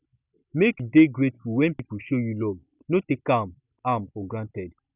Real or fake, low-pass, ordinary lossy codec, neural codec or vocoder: real; 3.6 kHz; none; none